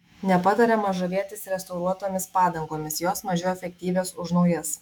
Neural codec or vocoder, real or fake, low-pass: autoencoder, 48 kHz, 128 numbers a frame, DAC-VAE, trained on Japanese speech; fake; 19.8 kHz